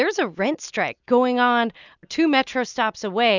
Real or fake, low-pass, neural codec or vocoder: real; 7.2 kHz; none